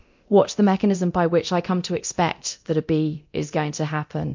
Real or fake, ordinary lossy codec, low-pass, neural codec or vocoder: fake; MP3, 48 kbps; 7.2 kHz; codec, 24 kHz, 0.9 kbps, DualCodec